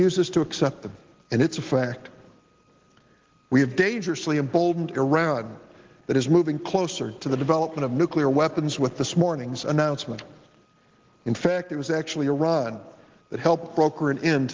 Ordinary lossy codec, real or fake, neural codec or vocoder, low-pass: Opus, 16 kbps; real; none; 7.2 kHz